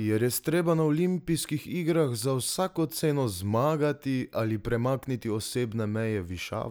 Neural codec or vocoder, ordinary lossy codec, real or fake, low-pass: none; none; real; none